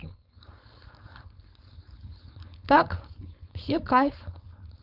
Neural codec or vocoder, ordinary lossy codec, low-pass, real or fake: codec, 16 kHz, 4.8 kbps, FACodec; none; 5.4 kHz; fake